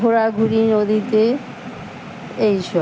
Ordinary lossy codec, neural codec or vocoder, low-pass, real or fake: none; none; none; real